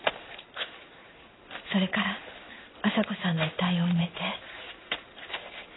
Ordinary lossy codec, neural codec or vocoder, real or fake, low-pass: AAC, 16 kbps; codec, 16 kHz in and 24 kHz out, 1 kbps, XY-Tokenizer; fake; 7.2 kHz